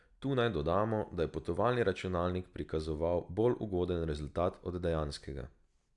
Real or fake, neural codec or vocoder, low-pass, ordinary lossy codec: real; none; 10.8 kHz; none